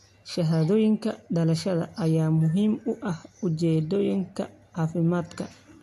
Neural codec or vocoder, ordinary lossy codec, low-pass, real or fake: none; AAC, 64 kbps; 14.4 kHz; real